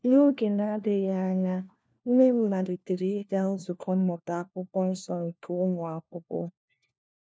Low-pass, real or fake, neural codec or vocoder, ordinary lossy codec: none; fake; codec, 16 kHz, 1 kbps, FunCodec, trained on LibriTTS, 50 frames a second; none